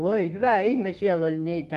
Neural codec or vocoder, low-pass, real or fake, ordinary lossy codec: codec, 32 kHz, 1.9 kbps, SNAC; 14.4 kHz; fake; Opus, 32 kbps